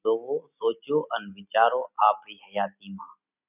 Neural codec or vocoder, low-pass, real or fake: none; 3.6 kHz; real